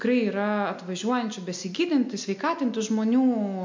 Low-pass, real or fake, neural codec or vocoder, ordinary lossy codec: 7.2 kHz; real; none; MP3, 48 kbps